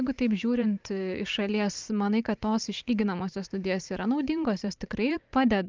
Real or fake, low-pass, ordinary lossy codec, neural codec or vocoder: fake; 7.2 kHz; Opus, 32 kbps; vocoder, 44.1 kHz, 128 mel bands every 512 samples, BigVGAN v2